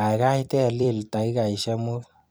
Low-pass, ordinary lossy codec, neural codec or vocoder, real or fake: none; none; vocoder, 44.1 kHz, 128 mel bands every 256 samples, BigVGAN v2; fake